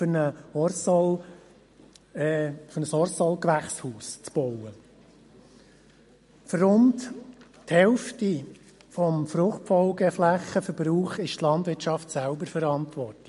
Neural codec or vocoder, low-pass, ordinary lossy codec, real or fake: none; 14.4 kHz; MP3, 48 kbps; real